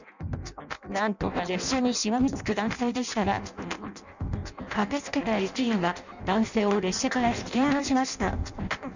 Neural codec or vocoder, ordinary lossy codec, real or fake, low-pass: codec, 16 kHz in and 24 kHz out, 0.6 kbps, FireRedTTS-2 codec; none; fake; 7.2 kHz